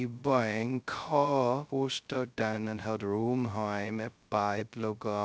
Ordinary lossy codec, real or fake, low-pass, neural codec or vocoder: none; fake; none; codec, 16 kHz, 0.2 kbps, FocalCodec